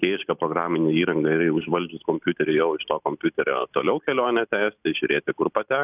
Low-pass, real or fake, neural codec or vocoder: 3.6 kHz; real; none